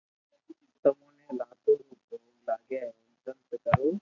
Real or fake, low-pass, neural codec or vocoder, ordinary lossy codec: real; 7.2 kHz; none; AAC, 48 kbps